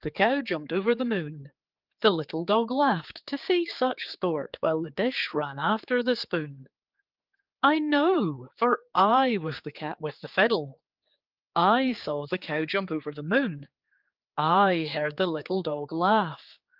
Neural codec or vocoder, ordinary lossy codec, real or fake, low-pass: codec, 16 kHz, 4 kbps, X-Codec, HuBERT features, trained on general audio; Opus, 32 kbps; fake; 5.4 kHz